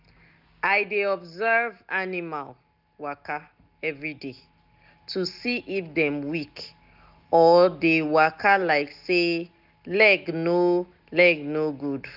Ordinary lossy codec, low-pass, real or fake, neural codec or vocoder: none; 5.4 kHz; real; none